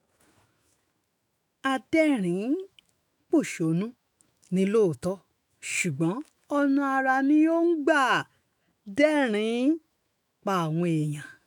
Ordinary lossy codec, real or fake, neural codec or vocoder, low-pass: none; fake; autoencoder, 48 kHz, 128 numbers a frame, DAC-VAE, trained on Japanese speech; 19.8 kHz